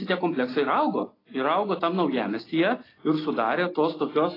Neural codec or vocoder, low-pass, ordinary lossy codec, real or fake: none; 5.4 kHz; AAC, 24 kbps; real